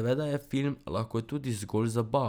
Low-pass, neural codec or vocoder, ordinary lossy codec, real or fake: none; none; none; real